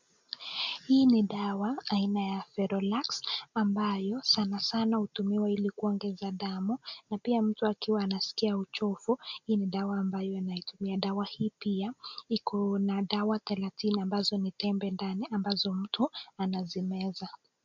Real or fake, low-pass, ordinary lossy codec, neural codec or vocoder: real; 7.2 kHz; MP3, 64 kbps; none